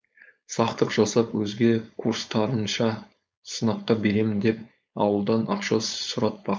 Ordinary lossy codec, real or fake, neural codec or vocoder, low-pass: none; fake; codec, 16 kHz, 4.8 kbps, FACodec; none